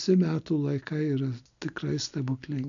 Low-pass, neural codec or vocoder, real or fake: 7.2 kHz; none; real